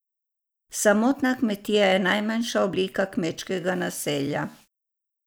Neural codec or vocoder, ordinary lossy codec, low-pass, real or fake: none; none; none; real